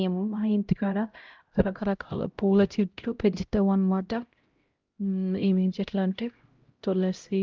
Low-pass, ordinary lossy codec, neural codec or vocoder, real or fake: 7.2 kHz; Opus, 24 kbps; codec, 16 kHz, 0.5 kbps, X-Codec, HuBERT features, trained on LibriSpeech; fake